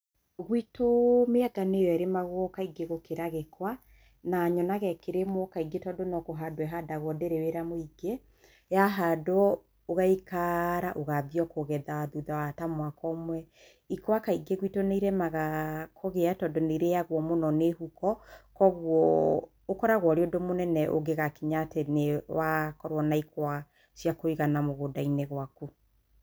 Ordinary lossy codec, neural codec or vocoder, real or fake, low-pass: none; none; real; none